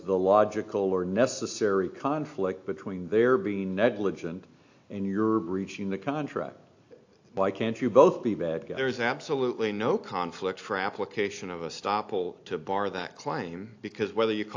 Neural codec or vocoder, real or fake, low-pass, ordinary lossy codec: none; real; 7.2 kHz; AAC, 48 kbps